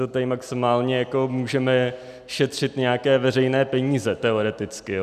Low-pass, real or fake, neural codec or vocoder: 14.4 kHz; real; none